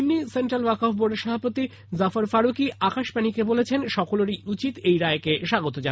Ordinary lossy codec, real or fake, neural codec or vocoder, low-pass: none; real; none; none